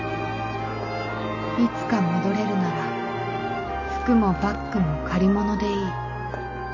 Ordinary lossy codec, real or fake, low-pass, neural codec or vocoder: none; real; 7.2 kHz; none